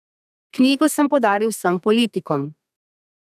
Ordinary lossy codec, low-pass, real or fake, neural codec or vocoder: none; 14.4 kHz; fake; codec, 32 kHz, 1.9 kbps, SNAC